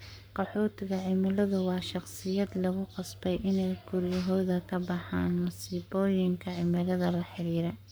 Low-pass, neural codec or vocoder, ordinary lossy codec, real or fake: none; codec, 44.1 kHz, 7.8 kbps, Pupu-Codec; none; fake